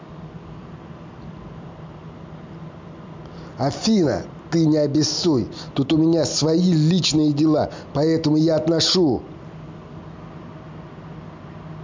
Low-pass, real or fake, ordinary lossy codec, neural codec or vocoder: 7.2 kHz; real; MP3, 64 kbps; none